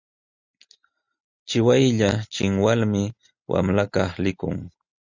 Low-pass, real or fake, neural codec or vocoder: 7.2 kHz; real; none